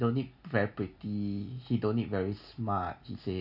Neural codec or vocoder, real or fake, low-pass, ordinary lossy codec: none; real; 5.4 kHz; none